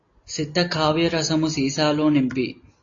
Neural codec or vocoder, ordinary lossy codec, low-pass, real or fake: none; AAC, 32 kbps; 7.2 kHz; real